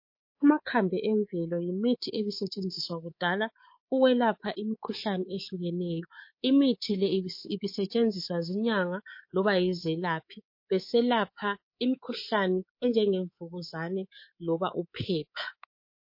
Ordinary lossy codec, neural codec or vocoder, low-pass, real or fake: MP3, 32 kbps; codec, 24 kHz, 3.1 kbps, DualCodec; 5.4 kHz; fake